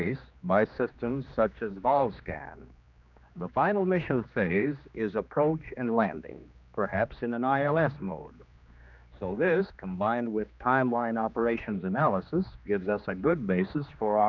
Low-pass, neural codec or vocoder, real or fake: 7.2 kHz; codec, 16 kHz, 2 kbps, X-Codec, HuBERT features, trained on general audio; fake